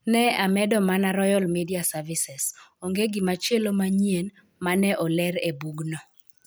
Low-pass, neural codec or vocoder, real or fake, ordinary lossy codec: none; none; real; none